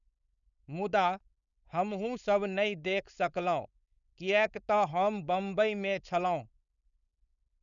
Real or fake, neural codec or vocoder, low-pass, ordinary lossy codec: fake; codec, 16 kHz, 4.8 kbps, FACodec; 7.2 kHz; none